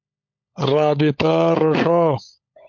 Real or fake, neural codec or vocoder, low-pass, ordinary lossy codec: fake; codec, 16 kHz, 16 kbps, FunCodec, trained on LibriTTS, 50 frames a second; 7.2 kHz; MP3, 48 kbps